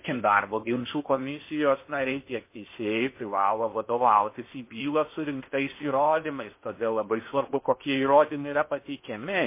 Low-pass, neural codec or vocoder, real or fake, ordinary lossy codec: 3.6 kHz; codec, 16 kHz in and 24 kHz out, 0.6 kbps, FocalCodec, streaming, 4096 codes; fake; MP3, 24 kbps